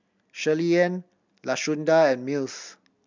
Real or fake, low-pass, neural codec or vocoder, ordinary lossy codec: real; 7.2 kHz; none; MP3, 64 kbps